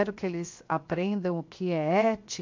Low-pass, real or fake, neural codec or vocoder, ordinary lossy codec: 7.2 kHz; fake; codec, 16 kHz, 0.7 kbps, FocalCodec; MP3, 48 kbps